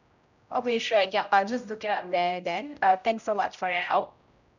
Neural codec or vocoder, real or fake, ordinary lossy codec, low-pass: codec, 16 kHz, 0.5 kbps, X-Codec, HuBERT features, trained on general audio; fake; none; 7.2 kHz